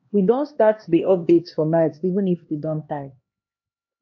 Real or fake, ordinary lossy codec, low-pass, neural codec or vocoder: fake; AAC, 48 kbps; 7.2 kHz; codec, 16 kHz, 1 kbps, X-Codec, HuBERT features, trained on LibriSpeech